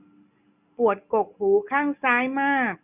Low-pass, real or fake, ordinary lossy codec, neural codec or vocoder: 3.6 kHz; real; none; none